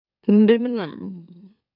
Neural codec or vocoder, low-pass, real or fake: autoencoder, 44.1 kHz, a latent of 192 numbers a frame, MeloTTS; 5.4 kHz; fake